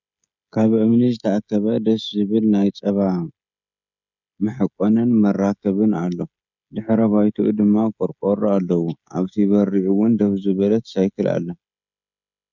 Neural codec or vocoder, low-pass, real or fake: codec, 16 kHz, 16 kbps, FreqCodec, smaller model; 7.2 kHz; fake